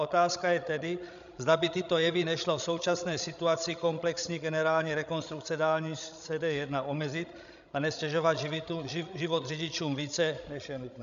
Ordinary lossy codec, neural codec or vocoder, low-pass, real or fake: AAC, 96 kbps; codec, 16 kHz, 16 kbps, FreqCodec, larger model; 7.2 kHz; fake